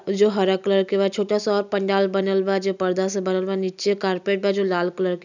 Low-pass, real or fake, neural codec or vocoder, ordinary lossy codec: 7.2 kHz; real; none; none